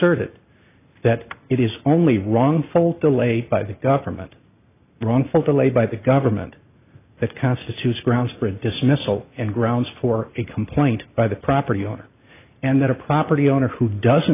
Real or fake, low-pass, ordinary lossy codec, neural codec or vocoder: real; 3.6 kHz; AAC, 24 kbps; none